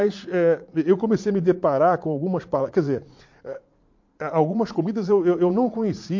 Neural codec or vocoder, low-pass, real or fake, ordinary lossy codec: vocoder, 44.1 kHz, 80 mel bands, Vocos; 7.2 kHz; fake; MP3, 48 kbps